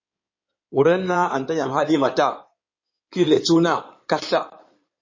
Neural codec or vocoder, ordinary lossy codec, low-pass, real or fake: codec, 16 kHz in and 24 kHz out, 2.2 kbps, FireRedTTS-2 codec; MP3, 32 kbps; 7.2 kHz; fake